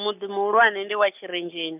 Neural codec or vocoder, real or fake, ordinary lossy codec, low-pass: none; real; none; 3.6 kHz